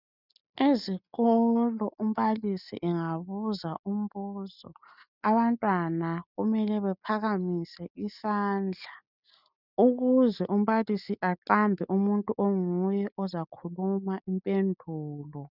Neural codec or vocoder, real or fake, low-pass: none; real; 5.4 kHz